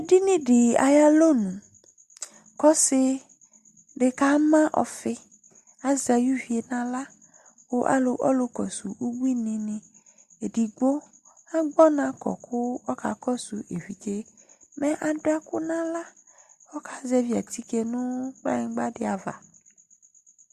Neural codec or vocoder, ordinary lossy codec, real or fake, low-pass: none; Opus, 64 kbps; real; 14.4 kHz